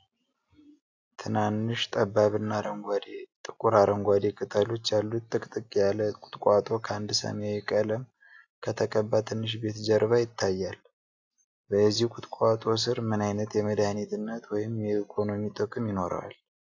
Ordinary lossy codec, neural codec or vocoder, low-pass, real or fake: AAC, 48 kbps; none; 7.2 kHz; real